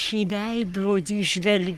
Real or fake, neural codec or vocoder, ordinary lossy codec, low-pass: fake; codec, 44.1 kHz, 3.4 kbps, Pupu-Codec; Opus, 32 kbps; 14.4 kHz